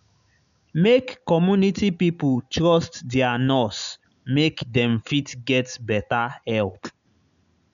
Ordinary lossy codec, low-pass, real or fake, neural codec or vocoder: none; 7.2 kHz; real; none